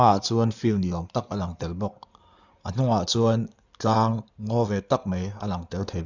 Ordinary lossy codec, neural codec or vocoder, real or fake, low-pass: none; codec, 16 kHz in and 24 kHz out, 2.2 kbps, FireRedTTS-2 codec; fake; 7.2 kHz